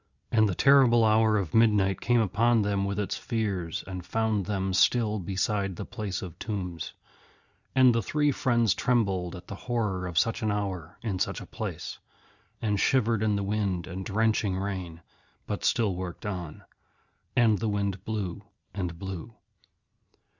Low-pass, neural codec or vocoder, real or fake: 7.2 kHz; none; real